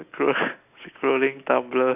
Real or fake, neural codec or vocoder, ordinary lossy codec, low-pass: real; none; none; 3.6 kHz